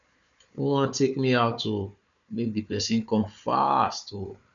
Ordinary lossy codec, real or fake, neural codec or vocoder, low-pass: none; fake; codec, 16 kHz, 4 kbps, FunCodec, trained on Chinese and English, 50 frames a second; 7.2 kHz